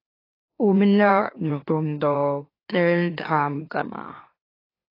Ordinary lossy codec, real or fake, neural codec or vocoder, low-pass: AAC, 24 kbps; fake; autoencoder, 44.1 kHz, a latent of 192 numbers a frame, MeloTTS; 5.4 kHz